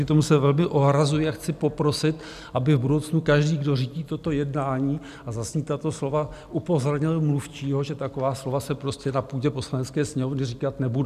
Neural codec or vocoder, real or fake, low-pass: none; real; 14.4 kHz